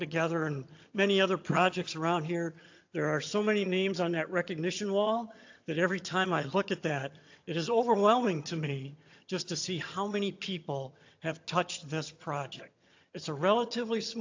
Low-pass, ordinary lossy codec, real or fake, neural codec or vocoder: 7.2 kHz; AAC, 48 kbps; fake; vocoder, 22.05 kHz, 80 mel bands, HiFi-GAN